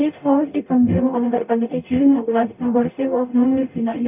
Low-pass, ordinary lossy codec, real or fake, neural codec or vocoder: 3.6 kHz; none; fake; codec, 44.1 kHz, 0.9 kbps, DAC